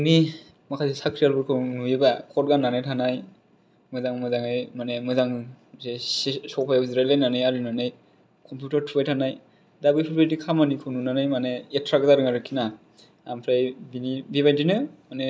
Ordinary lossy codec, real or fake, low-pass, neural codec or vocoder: none; real; none; none